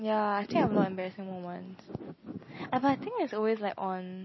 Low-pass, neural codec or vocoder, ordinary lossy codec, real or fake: 7.2 kHz; none; MP3, 24 kbps; real